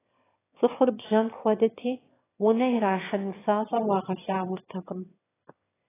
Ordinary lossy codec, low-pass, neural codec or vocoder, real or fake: AAC, 16 kbps; 3.6 kHz; autoencoder, 22.05 kHz, a latent of 192 numbers a frame, VITS, trained on one speaker; fake